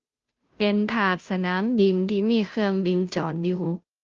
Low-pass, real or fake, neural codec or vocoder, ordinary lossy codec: 7.2 kHz; fake; codec, 16 kHz, 0.5 kbps, FunCodec, trained on Chinese and English, 25 frames a second; Opus, 32 kbps